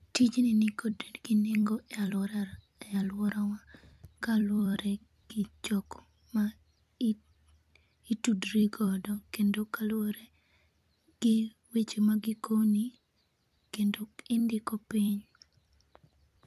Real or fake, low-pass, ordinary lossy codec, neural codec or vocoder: fake; 14.4 kHz; none; vocoder, 44.1 kHz, 128 mel bands every 256 samples, BigVGAN v2